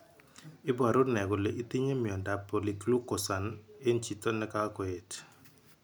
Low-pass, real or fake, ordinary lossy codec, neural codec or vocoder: none; real; none; none